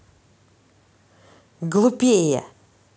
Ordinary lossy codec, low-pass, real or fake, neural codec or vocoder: none; none; real; none